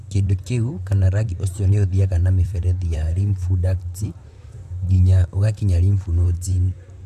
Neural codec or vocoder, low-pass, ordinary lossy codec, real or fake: vocoder, 44.1 kHz, 128 mel bands, Pupu-Vocoder; 14.4 kHz; none; fake